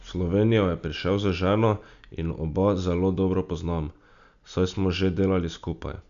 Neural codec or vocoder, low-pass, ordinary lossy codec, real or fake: none; 7.2 kHz; none; real